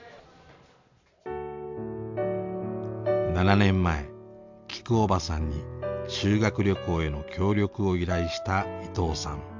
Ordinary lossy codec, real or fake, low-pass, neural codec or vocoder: MP3, 64 kbps; real; 7.2 kHz; none